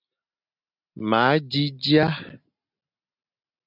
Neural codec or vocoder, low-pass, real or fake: none; 5.4 kHz; real